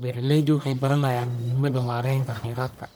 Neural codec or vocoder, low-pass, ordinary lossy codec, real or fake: codec, 44.1 kHz, 1.7 kbps, Pupu-Codec; none; none; fake